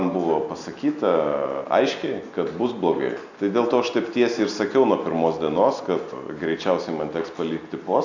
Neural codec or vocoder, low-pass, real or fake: none; 7.2 kHz; real